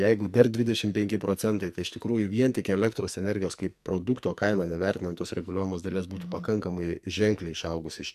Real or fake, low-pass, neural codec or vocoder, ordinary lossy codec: fake; 14.4 kHz; codec, 44.1 kHz, 2.6 kbps, SNAC; MP3, 96 kbps